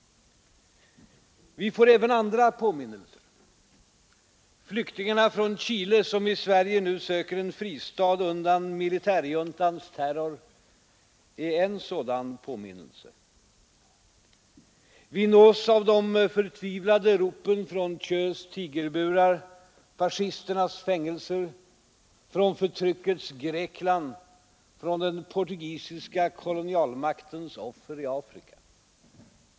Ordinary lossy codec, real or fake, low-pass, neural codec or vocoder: none; real; none; none